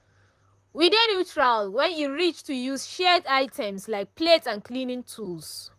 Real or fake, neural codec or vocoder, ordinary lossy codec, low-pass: fake; vocoder, 44.1 kHz, 128 mel bands, Pupu-Vocoder; AAC, 96 kbps; 14.4 kHz